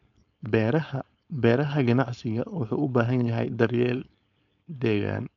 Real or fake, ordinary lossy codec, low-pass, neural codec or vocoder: fake; none; 7.2 kHz; codec, 16 kHz, 4.8 kbps, FACodec